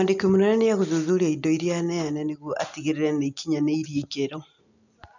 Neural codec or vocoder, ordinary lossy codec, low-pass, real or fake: none; none; 7.2 kHz; real